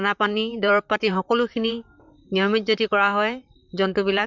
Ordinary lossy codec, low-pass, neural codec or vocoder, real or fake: none; 7.2 kHz; vocoder, 44.1 kHz, 128 mel bands, Pupu-Vocoder; fake